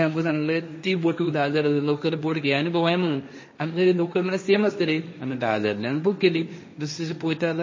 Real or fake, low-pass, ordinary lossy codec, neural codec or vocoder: fake; 7.2 kHz; MP3, 32 kbps; codec, 16 kHz, 1.1 kbps, Voila-Tokenizer